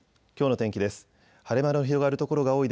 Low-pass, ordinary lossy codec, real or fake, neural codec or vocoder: none; none; real; none